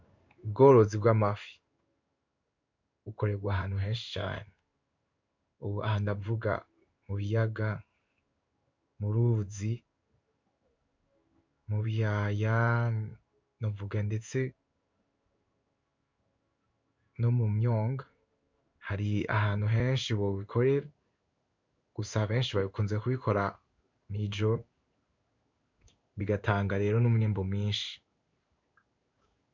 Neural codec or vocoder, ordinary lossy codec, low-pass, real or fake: codec, 16 kHz in and 24 kHz out, 1 kbps, XY-Tokenizer; MP3, 64 kbps; 7.2 kHz; fake